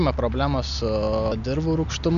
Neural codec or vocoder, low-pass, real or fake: none; 7.2 kHz; real